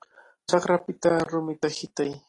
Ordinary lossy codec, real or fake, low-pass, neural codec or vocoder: AAC, 32 kbps; real; 10.8 kHz; none